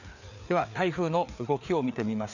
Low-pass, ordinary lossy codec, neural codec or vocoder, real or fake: 7.2 kHz; none; codec, 16 kHz, 4 kbps, FreqCodec, larger model; fake